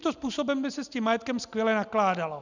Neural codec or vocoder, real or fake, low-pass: none; real; 7.2 kHz